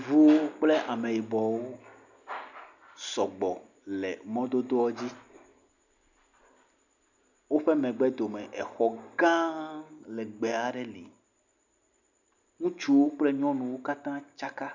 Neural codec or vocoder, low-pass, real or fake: none; 7.2 kHz; real